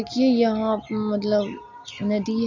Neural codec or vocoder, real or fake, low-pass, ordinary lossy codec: none; real; 7.2 kHz; AAC, 48 kbps